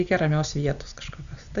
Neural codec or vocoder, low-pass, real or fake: none; 7.2 kHz; real